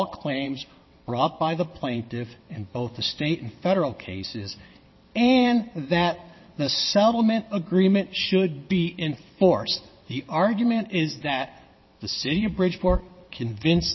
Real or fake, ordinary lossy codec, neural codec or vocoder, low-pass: fake; MP3, 24 kbps; vocoder, 22.05 kHz, 80 mel bands, WaveNeXt; 7.2 kHz